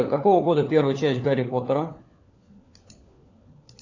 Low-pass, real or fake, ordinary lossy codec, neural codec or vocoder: 7.2 kHz; fake; AAC, 48 kbps; codec, 16 kHz, 4 kbps, FunCodec, trained on LibriTTS, 50 frames a second